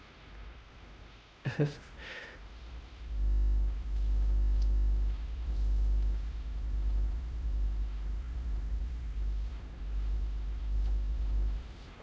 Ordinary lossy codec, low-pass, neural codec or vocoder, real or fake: none; none; codec, 16 kHz, 1 kbps, X-Codec, WavLM features, trained on Multilingual LibriSpeech; fake